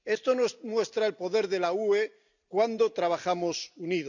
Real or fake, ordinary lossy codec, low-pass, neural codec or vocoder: real; none; 7.2 kHz; none